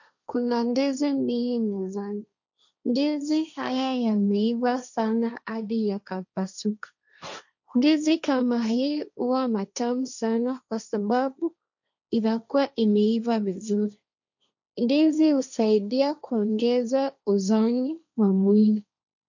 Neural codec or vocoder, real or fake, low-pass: codec, 16 kHz, 1.1 kbps, Voila-Tokenizer; fake; 7.2 kHz